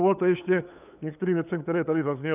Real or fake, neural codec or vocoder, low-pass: fake; codec, 16 kHz, 8 kbps, FunCodec, trained on LibriTTS, 25 frames a second; 3.6 kHz